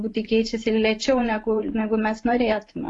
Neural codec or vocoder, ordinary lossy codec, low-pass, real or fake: vocoder, 44.1 kHz, 128 mel bands every 512 samples, BigVGAN v2; AAC, 48 kbps; 10.8 kHz; fake